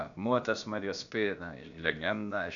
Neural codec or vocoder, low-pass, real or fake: codec, 16 kHz, about 1 kbps, DyCAST, with the encoder's durations; 7.2 kHz; fake